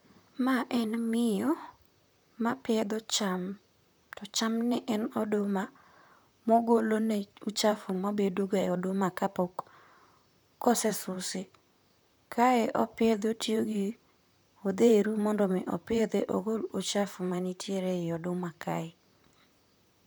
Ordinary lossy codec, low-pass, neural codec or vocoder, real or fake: none; none; vocoder, 44.1 kHz, 128 mel bands, Pupu-Vocoder; fake